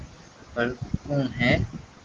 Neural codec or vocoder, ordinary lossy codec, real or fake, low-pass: none; Opus, 16 kbps; real; 7.2 kHz